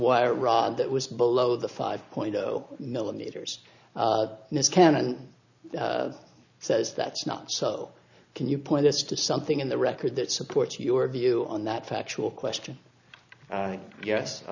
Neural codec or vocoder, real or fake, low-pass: none; real; 7.2 kHz